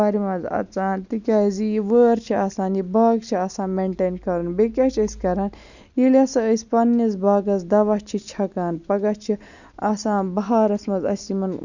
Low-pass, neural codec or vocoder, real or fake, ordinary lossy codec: 7.2 kHz; none; real; none